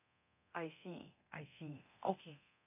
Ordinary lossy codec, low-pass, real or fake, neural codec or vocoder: none; 3.6 kHz; fake; codec, 24 kHz, 0.9 kbps, DualCodec